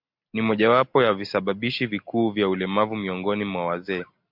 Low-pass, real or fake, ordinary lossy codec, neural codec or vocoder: 5.4 kHz; real; MP3, 48 kbps; none